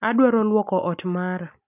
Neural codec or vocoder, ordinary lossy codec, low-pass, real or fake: none; none; 3.6 kHz; real